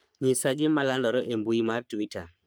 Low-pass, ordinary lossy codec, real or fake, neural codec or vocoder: none; none; fake; codec, 44.1 kHz, 3.4 kbps, Pupu-Codec